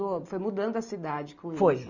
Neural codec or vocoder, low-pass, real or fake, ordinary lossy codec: none; 7.2 kHz; real; none